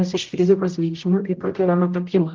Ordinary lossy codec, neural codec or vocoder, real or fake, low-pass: Opus, 24 kbps; codec, 16 kHz, 0.5 kbps, X-Codec, HuBERT features, trained on general audio; fake; 7.2 kHz